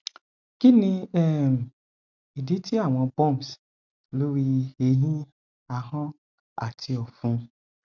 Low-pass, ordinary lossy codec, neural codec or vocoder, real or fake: 7.2 kHz; none; none; real